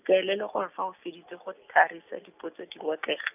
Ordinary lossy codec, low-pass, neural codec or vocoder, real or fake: none; 3.6 kHz; none; real